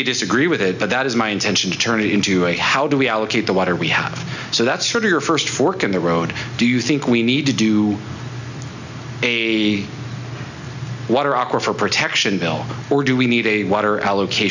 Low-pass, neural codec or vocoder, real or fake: 7.2 kHz; none; real